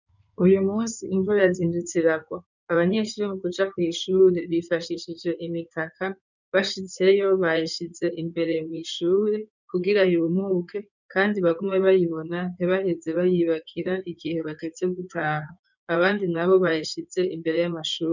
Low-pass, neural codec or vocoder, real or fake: 7.2 kHz; codec, 16 kHz in and 24 kHz out, 2.2 kbps, FireRedTTS-2 codec; fake